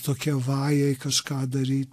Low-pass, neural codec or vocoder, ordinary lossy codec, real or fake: 14.4 kHz; none; AAC, 64 kbps; real